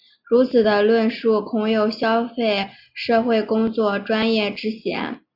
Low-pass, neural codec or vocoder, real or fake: 5.4 kHz; none; real